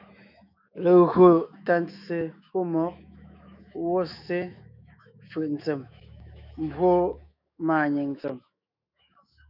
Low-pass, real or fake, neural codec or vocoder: 5.4 kHz; fake; autoencoder, 48 kHz, 128 numbers a frame, DAC-VAE, trained on Japanese speech